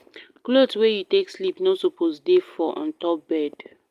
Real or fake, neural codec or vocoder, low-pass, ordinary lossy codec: real; none; 14.4 kHz; Opus, 32 kbps